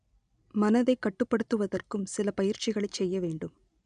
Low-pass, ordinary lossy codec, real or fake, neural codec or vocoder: 9.9 kHz; none; real; none